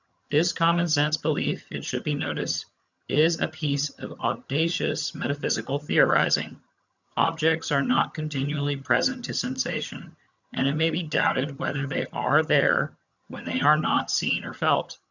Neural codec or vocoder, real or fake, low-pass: vocoder, 22.05 kHz, 80 mel bands, HiFi-GAN; fake; 7.2 kHz